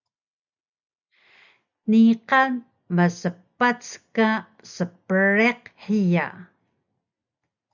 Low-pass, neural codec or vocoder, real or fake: 7.2 kHz; none; real